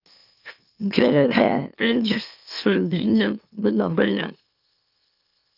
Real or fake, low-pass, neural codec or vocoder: fake; 5.4 kHz; autoencoder, 44.1 kHz, a latent of 192 numbers a frame, MeloTTS